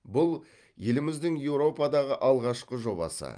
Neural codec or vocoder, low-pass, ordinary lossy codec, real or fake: vocoder, 44.1 kHz, 128 mel bands every 512 samples, BigVGAN v2; 9.9 kHz; Opus, 32 kbps; fake